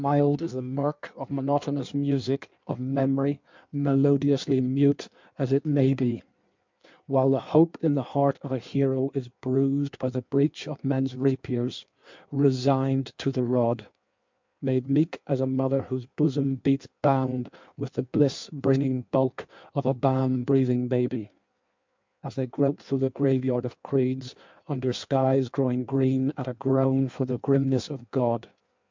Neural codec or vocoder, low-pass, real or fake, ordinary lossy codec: codec, 16 kHz in and 24 kHz out, 1.1 kbps, FireRedTTS-2 codec; 7.2 kHz; fake; MP3, 64 kbps